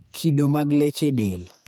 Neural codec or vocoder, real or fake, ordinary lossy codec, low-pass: codec, 44.1 kHz, 2.6 kbps, SNAC; fake; none; none